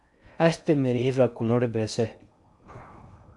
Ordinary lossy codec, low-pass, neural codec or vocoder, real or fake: MP3, 96 kbps; 10.8 kHz; codec, 16 kHz in and 24 kHz out, 0.6 kbps, FocalCodec, streaming, 2048 codes; fake